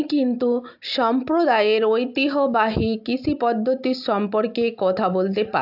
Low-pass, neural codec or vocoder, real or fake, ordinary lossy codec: 5.4 kHz; none; real; AAC, 48 kbps